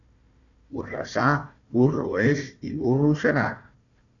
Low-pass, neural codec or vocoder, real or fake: 7.2 kHz; codec, 16 kHz, 1 kbps, FunCodec, trained on Chinese and English, 50 frames a second; fake